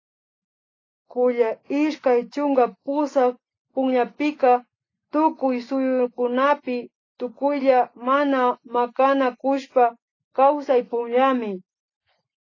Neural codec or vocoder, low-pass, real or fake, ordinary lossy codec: none; 7.2 kHz; real; AAC, 32 kbps